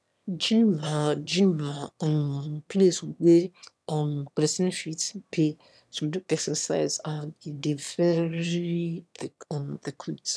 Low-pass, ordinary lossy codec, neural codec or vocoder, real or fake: none; none; autoencoder, 22.05 kHz, a latent of 192 numbers a frame, VITS, trained on one speaker; fake